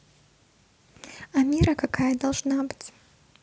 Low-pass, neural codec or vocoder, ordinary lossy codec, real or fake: none; none; none; real